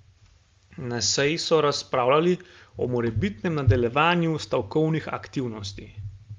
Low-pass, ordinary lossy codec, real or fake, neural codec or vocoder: 7.2 kHz; Opus, 32 kbps; real; none